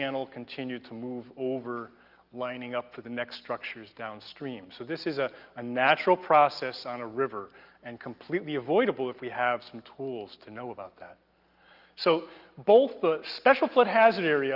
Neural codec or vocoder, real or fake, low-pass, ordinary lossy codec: none; real; 5.4 kHz; Opus, 32 kbps